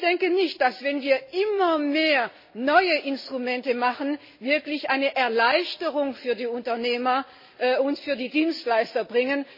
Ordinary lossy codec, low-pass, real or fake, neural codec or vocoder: MP3, 24 kbps; 5.4 kHz; real; none